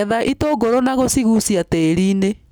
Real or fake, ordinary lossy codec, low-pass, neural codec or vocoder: real; none; none; none